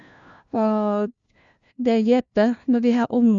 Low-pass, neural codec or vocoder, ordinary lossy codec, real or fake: 7.2 kHz; codec, 16 kHz, 1 kbps, FunCodec, trained on LibriTTS, 50 frames a second; Opus, 64 kbps; fake